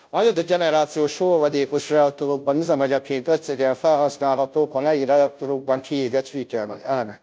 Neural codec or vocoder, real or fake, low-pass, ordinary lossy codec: codec, 16 kHz, 0.5 kbps, FunCodec, trained on Chinese and English, 25 frames a second; fake; none; none